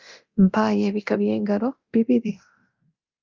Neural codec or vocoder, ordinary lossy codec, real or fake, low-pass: codec, 24 kHz, 0.9 kbps, DualCodec; Opus, 32 kbps; fake; 7.2 kHz